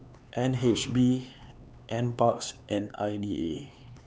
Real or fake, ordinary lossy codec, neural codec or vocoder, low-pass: fake; none; codec, 16 kHz, 4 kbps, X-Codec, HuBERT features, trained on LibriSpeech; none